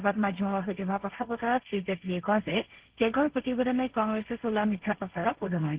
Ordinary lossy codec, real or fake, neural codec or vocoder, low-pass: Opus, 16 kbps; fake; codec, 16 kHz, 1.1 kbps, Voila-Tokenizer; 3.6 kHz